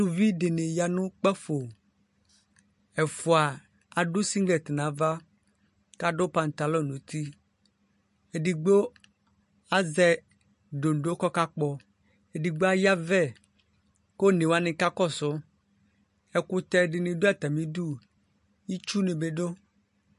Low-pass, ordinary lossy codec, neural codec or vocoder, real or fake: 14.4 kHz; MP3, 48 kbps; none; real